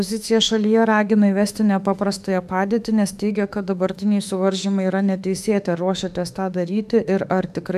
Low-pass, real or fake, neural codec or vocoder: 14.4 kHz; fake; autoencoder, 48 kHz, 32 numbers a frame, DAC-VAE, trained on Japanese speech